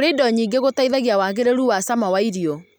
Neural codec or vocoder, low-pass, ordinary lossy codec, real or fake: none; none; none; real